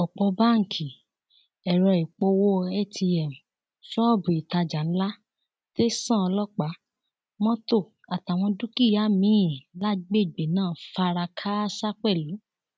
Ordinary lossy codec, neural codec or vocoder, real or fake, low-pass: none; none; real; none